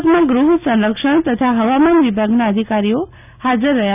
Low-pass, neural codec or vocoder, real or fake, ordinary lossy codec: 3.6 kHz; none; real; none